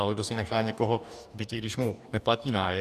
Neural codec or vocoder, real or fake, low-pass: codec, 44.1 kHz, 2.6 kbps, DAC; fake; 14.4 kHz